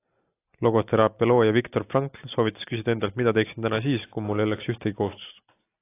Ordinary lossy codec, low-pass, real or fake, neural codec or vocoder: AAC, 24 kbps; 3.6 kHz; real; none